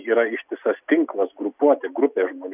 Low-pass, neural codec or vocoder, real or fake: 3.6 kHz; none; real